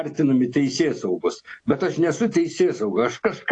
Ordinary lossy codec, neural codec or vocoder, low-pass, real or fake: AAC, 48 kbps; none; 9.9 kHz; real